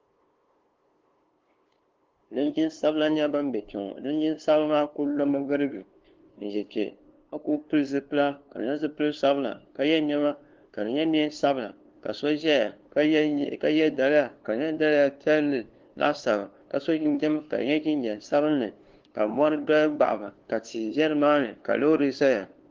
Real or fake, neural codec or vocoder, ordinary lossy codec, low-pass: fake; codec, 16 kHz, 2 kbps, FunCodec, trained on LibriTTS, 25 frames a second; Opus, 16 kbps; 7.2 kHz